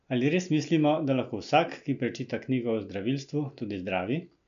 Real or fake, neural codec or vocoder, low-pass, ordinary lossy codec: real; none; 7.2 kHz; none